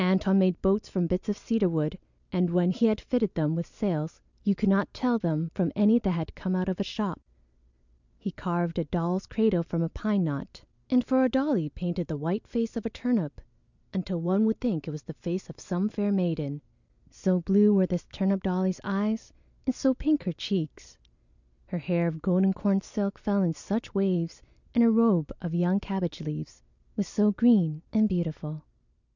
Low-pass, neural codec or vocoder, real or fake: 7.2 kHz; none; real